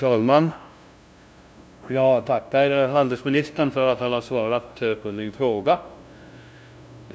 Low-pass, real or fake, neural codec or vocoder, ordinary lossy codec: none; fake; codec, 16 kHz, 0.5 kbps, FunCodec, trained on LibriTTS, 25 frames a second; none